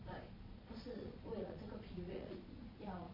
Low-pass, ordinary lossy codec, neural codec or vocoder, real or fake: 5.4 kHz; MP3, 24 kbps; vocoder, 22.05 kHz, 80 mel bands, Vocos; fake